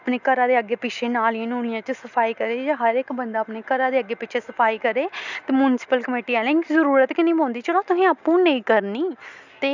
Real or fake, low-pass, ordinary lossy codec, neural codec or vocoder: real; 7.2 kHz; none; none